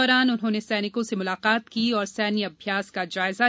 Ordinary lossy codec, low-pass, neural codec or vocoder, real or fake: none; none; none; real